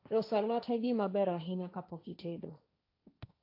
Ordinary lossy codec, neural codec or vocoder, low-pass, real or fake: AAC, 32 kbps; codec, 16 kHz, 1.1 kbps, Voila-Tokenizer; 5.4 kHz; fake